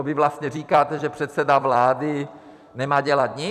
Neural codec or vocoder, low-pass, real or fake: vocoder, 44.1 kHz, 128 mel bands every 256 samples, BigVGAN v2; 14.4 kHz; fake